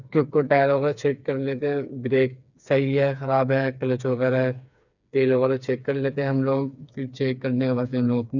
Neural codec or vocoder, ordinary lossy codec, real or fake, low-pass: codec, 16 kHz, 4 kbps, FreqCodec, smaller model; none; fake; 7.2 kHz